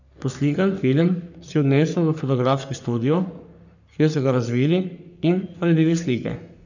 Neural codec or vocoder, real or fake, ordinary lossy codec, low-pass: codec, 44.1 kHz, 3.4 kbps, Pupu-Codec; fake; none; 7.2 kHz